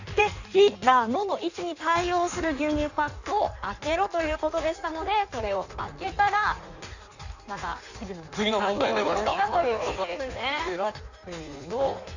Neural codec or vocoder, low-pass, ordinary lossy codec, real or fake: codec, 16 kHz in and 24 kHz out, 1.1 kbps, FireRedTTS-2 codec; 7.2 kHz; none; fake